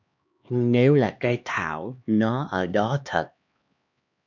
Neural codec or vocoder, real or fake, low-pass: codec, 16 kHz, 2 kbps, X-Codec, HuBERT features, trained on LibriSpeech; fake; 7.2 kHz